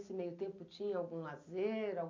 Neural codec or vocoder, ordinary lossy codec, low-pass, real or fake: none; none; 7.2 kHz; real